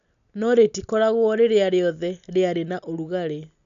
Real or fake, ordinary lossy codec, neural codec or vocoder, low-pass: real; none; none; 7.2 kHz